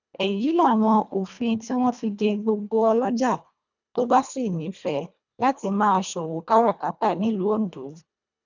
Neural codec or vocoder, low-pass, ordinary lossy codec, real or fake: codec, 24 kHz, 1.5 kbps, HILCodec; 7.2 kHz; none; fake